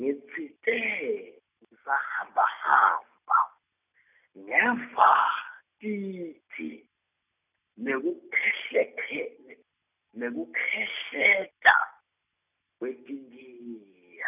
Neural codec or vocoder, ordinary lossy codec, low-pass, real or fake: none; none; 3.6 kHz; real